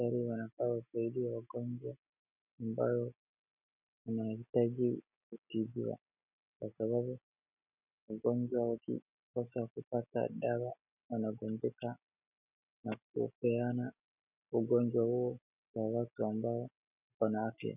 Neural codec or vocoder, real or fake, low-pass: none; real; 3.6 kHz